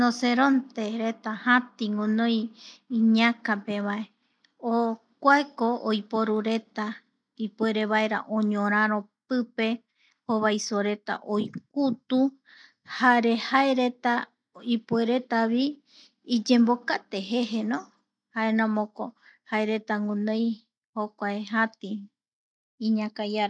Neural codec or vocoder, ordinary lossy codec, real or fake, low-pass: none; none; real; 9.9 kHz